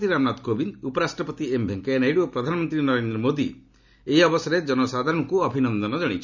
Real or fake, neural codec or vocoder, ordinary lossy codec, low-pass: real; none; none; 7.2 kHz